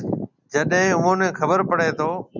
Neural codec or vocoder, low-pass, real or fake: none; 7.2 kHz; real